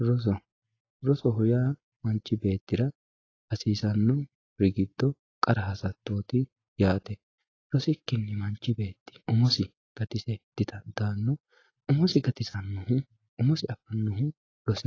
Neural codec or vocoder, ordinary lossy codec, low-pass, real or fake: none; AAC, 32 kbps; 7.2 kHz; real